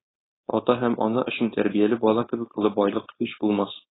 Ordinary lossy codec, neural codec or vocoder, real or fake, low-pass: AAC, 16 kbps; codec, 16 kHz, 4.8 kbps, FACodec; fake; 7.2 kHz